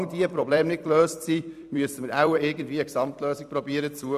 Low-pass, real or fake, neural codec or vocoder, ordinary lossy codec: 14.4 kHz; fake; vocoder, 44.1 kHz, 128 mel bands every 256 samples, BigVGAN v2; none